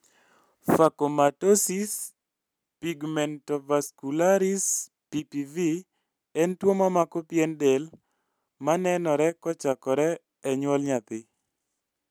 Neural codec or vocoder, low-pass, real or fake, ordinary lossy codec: none; none; real; none